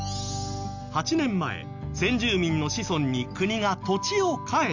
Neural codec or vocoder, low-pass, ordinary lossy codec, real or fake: none; 7.2 kHz; none; real